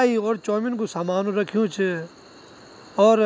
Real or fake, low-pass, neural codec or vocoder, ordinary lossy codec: real; none; none; none